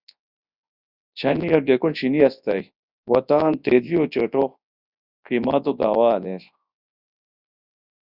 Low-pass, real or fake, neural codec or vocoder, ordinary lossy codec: 5.4 kHz; fake; codec, 24 kHz, 0.9 kbps, WavTokenizer, large speech release; Opus, 64 kbps